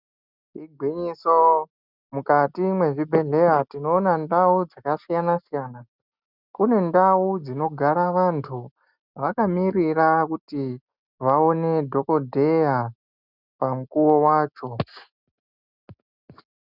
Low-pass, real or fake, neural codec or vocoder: 5.4 kHz; real; none